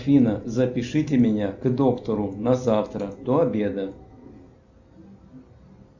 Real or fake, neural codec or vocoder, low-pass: real; none; 7.2 kHz